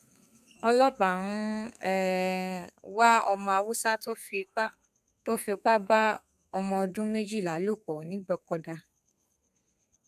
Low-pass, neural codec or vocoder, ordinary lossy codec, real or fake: 14.4 kHz; codec, 32 kHz, 1.9 kbps, SNAC; none; fake